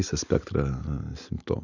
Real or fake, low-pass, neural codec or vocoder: real; 7.2 kHz; none